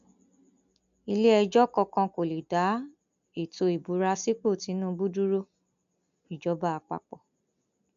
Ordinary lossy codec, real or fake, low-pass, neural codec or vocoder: none; real; 7.2 kHz; none